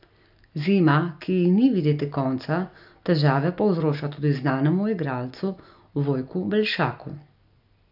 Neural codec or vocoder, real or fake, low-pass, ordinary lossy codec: none; real; 5.4 kHz; none